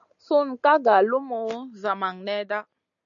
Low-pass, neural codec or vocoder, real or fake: 7.2 kHz; none; real